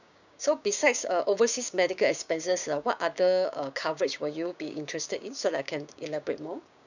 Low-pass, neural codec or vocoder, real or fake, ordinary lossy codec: 7.2 kHz; codec, 16 kHz, 6 kbps, DAC; fake; none